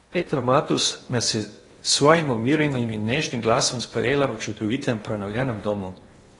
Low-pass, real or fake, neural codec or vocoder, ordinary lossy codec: 10.8 kHz; fake; codec, 16 kHz in and 24 kHz out, 0.8 kbps, FocalCodec, streaming, 65536 codes; AAC, 32 kbps